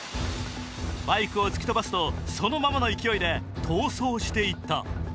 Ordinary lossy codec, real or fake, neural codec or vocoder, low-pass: none; real; none; none